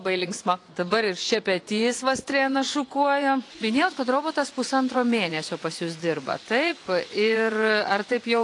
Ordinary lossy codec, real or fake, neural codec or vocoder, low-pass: AAC, 48 kbps; fake; vocoder, 24 kHz, 100 mel bands, Vocos; 10.8 kHz